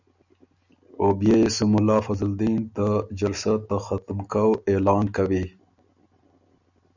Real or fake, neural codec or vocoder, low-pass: real; none; 7.2 kHz